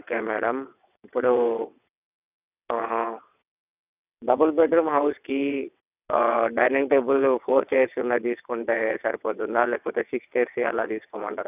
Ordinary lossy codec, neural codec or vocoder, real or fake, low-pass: none; vocoder, 22.05 kHz, 80 mel bands, WaveNeXt; fake; 3.6 kHz